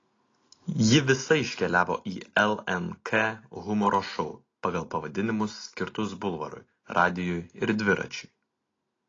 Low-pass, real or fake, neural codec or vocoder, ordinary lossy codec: 7.2 kHz; real; none; AAC, 32 kbps